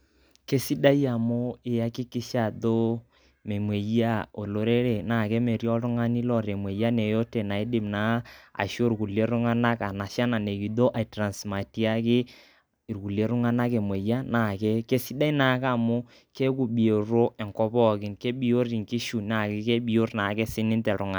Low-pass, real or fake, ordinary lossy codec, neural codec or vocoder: none; real; none; none